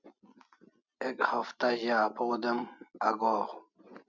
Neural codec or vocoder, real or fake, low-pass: none; real; 7.2 kHz